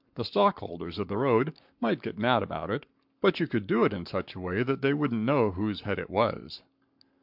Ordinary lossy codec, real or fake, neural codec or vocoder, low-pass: MP3, 48 kbps; fake; codec, 44.1 kHz, 7.8 kbps, Pupu-Codec; 5.4 kHz